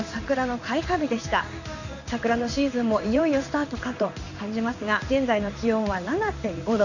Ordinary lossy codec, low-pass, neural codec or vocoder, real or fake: none; 7.2 kHz; codec, 16 kHz in and 24 kHz out, 1 kbps, XY-Tokenizer; fake